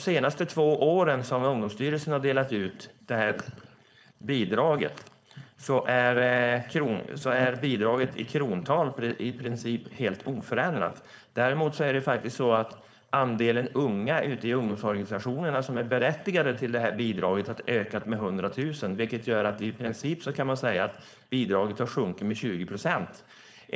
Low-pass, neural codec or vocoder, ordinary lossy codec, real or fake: none; codec, 16 kHz, 4.8 kbps, FACodec; none; fake